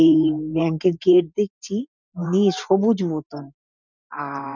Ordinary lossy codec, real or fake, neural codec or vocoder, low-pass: none; fake; vocoder, 44.1 kHz, 128 mel bands every 512 samples, BigVGAN v2; 7.2 kHz